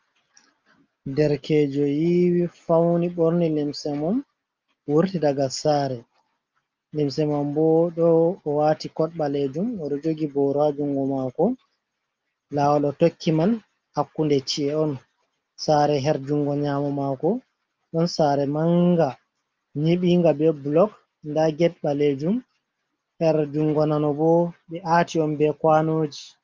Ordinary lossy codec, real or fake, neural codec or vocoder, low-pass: Opus, 24 kbps; real; none; 7.2 kHz